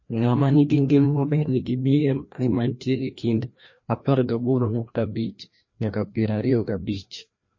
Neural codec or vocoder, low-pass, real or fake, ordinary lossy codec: codec, 16 kHz, 1 kbps, FreqCodec, larger model; 7.2 kHz; fake; MP3, 32 kbps